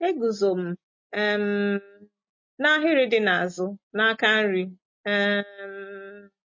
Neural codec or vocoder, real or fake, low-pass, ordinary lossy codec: none; real; 7.2 kHz; MP3, 32 kbps